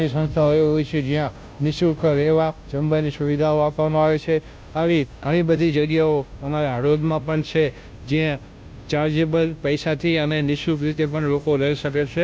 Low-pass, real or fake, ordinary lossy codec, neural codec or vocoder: none; fake; none; codec, 16 kHz, 0.5 kbps, FunCodec, trained on Chinese and English, 25 frames a second